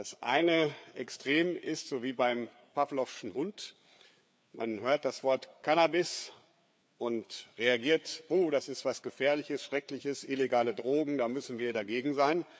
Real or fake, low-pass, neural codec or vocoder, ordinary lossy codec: fake; none; codec, 16 kHz, 4 kbps, FreqCodec, larger model; none